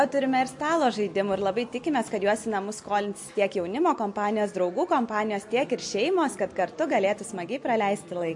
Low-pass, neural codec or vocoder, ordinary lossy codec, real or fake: 10.8 kHz; none; MP3, 48 kbps; real